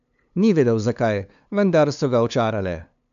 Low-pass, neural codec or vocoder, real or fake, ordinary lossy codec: 7.2 kHz; codec, 16 kHz, 2 kbps, FunCodec, trained on LibriTTS, 25 frames a second; fake; none